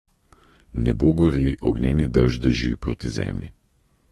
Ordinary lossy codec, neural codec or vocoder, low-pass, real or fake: AAC, 32 kbps; codec, 32 kHz, 1.9 kbps, SNAC; 14.4 kHz; fake